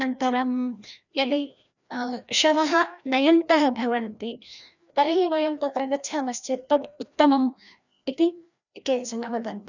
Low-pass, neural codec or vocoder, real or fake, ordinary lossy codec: 7.2 kHz; codec, 16 kHz, 1 kbps, FreqCodec, larger model; fake; none